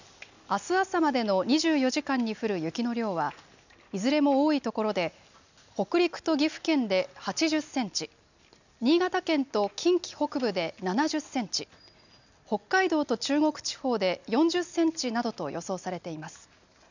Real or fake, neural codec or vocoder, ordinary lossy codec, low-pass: real; none; none; 7.2 kHz